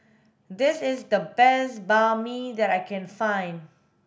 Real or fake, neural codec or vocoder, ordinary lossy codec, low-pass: real; none; none; none